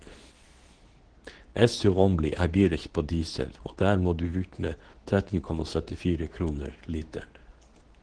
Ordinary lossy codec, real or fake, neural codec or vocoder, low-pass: Opus, 16 kbps; fake; codec, 24 kHz, 0.9 kbps, WavTokenizer, medium speech release version 2; 9.9 kHz